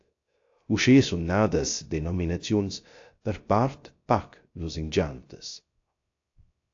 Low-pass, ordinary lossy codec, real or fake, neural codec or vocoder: 7.2 kHz; AAC, 48 kbps; fake; codec, 16 kHz, 0.3 kbps, FocalCodec